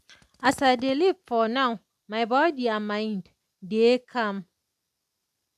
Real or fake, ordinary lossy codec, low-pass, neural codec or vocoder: real; none; 14.4 kHz; none